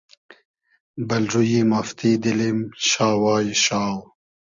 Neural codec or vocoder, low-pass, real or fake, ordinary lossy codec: none; 7.2 kHz; real; Opus, 64 kbps